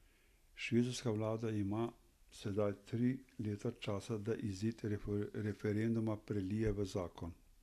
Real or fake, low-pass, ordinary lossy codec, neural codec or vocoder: real; 14.4 kHz; AAC, 96 kbps; none